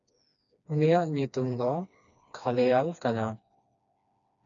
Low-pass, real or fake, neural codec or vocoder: 7.2 kHz; fake; codec, 16 kHz, 2 kbps, FreqCodec, smaller model